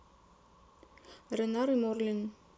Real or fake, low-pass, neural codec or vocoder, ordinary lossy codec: real; none; none; none